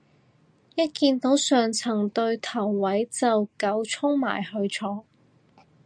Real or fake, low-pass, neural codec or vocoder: real; 9.9 kHz; none